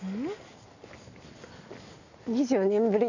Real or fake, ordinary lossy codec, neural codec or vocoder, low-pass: real; none; none; 7.2 kHz